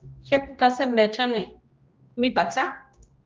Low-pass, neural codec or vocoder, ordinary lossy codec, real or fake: 7.2 kHz; codec, 16 kHz, 1 kbps, X-Codec, HuBERT features, trained on general audio; Opus, 24 kbps; fake